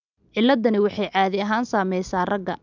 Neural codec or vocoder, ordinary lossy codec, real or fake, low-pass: none; none; real; 7.2 kHz